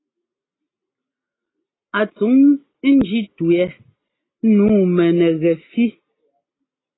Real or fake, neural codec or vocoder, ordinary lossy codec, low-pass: real; none; AAC, 16 kbps; 7.2 kHz